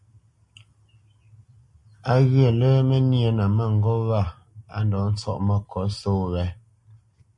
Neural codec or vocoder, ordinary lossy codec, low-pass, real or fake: none; MP3, 48 kbps; 10.8 kHz; real